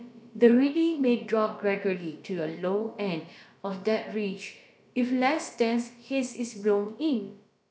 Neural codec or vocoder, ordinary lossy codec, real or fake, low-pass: codec, 16 kHz, about 1 kbps, DyCAST, with the encoder's durations; none; fake; none